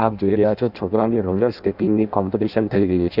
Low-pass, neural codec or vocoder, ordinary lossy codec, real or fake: 5.4 kHz; codec, 16 kHz in and 24 kHz out, 0.6 kbps, FireRedTTS-2 codec; none; fake